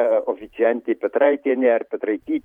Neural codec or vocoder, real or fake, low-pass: vocoder, 44.1 kHz, 128 mel bands every 512 samples, BigVGAN v2; fake; 19.8 kHz